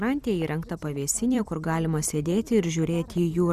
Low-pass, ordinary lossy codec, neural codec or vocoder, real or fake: 14.4 kHz; Opus, 64 kbps; vocoder, 44.1 kHz, 128 mel bands every 256 samples, BigVGAN v2; fake